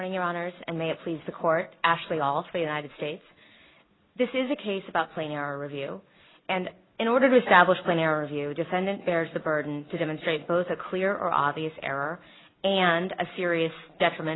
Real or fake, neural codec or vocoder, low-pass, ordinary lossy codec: real; none; 7.2 kHz; AAC, 16 kbps